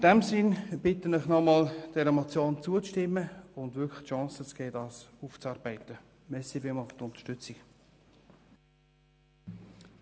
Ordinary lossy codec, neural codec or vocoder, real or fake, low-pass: none; none; real; none